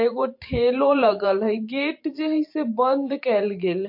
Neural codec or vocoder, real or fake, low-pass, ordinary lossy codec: vocoder, 44.1 kHz, 128 mel bands every 512 samples, BigVGAN v2; fake; 5.4 kHz; MP3, 32 kbps